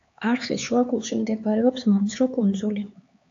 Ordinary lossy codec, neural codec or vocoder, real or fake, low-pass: AAC, 48 kbps; codec, 16 kHz, 4 kbps, X-Codec, HuBERT features, trained on LibriSpeech; fake; 7.2 kHz